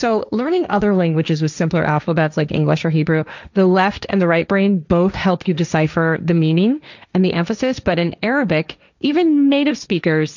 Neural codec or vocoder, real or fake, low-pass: codec, 16 kHz, 1.1 kbps, Voila-Tokenizer; fake; 7.2 kHz